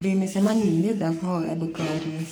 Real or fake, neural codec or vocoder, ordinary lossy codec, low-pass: fake; codec, 44.1 kHz, 3.4 kbps, Pupu-Codec; none; none